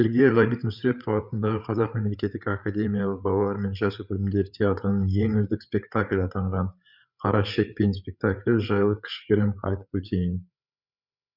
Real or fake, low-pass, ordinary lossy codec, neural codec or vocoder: fake; 5.4 kHz; none; codec, 16 kHz, 8 kbps, FreqCodec, larger model